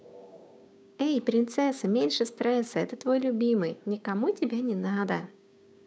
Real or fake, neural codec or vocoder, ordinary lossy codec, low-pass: fake; codec, 16 kHz, 6 kbps, DAC; none; none